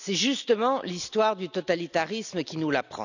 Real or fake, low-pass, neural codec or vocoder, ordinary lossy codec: real; 7.2 kHz; none; none